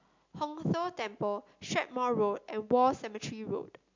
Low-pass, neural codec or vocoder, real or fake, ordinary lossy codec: 7.2 kHz; none; real; MP3, 64 kbps